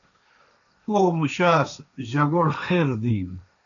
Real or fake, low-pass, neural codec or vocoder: fake; 7.2 kHz; codec, 16 kHz, 1.1 kbps, Voila-Tokenizer